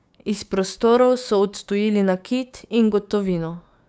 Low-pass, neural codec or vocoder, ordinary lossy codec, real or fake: none; codec, 16 kHz, 6 kbps, DAC; none; fake